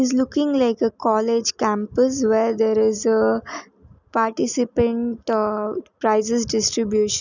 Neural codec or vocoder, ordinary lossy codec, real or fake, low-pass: none; none; real; 7.2 kHz